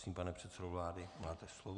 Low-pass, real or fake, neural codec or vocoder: 10.8 kHz; real; none